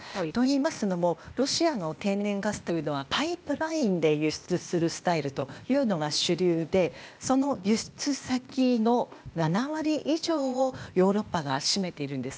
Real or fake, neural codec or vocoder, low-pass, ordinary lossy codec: fake; codec, 16 kHz, 0.8 kbps, ZipCodec; none; none